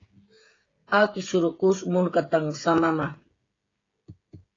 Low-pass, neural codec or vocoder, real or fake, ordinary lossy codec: 7.2 kHz; codec, 16 kHz, 16 kbps, FreqCodec, smaller model; fake; AAC, 32 kbps